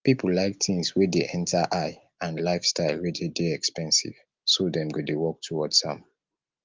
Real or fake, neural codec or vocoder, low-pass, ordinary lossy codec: real; none; 7.2 kHz; Opus, 32 kbps